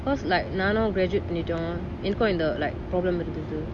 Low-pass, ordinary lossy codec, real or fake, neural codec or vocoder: none; none; real; none